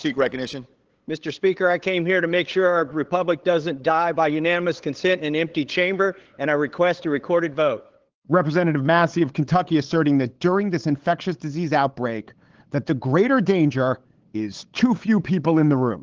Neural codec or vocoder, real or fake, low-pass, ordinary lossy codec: codec, 16 kHz, 8 kbps, FunCodec, trained on LibriTTS, 25 frames a second; fake; 7.2 kHz; Opus, 16 kbps